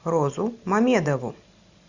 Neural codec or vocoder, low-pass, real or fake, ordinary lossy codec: none; 7.2 kHz; real; Opus, 64 kbps